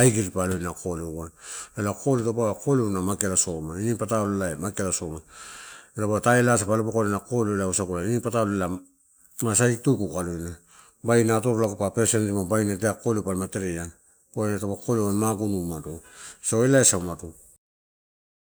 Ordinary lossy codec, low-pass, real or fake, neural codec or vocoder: none; none; real; none